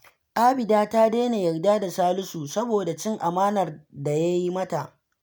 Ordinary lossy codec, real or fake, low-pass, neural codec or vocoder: none; real; none; none